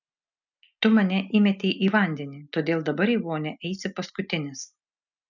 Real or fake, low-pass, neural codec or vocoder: real; 7.2 kHz; none